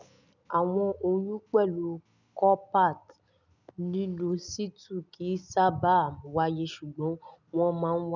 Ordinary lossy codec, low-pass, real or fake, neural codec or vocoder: none; 7.2 kHz; real; none